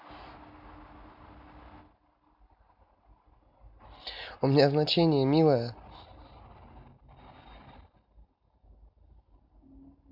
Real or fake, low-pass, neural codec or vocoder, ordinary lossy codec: real; 5.4 kHz; none; MP3, 48 kbps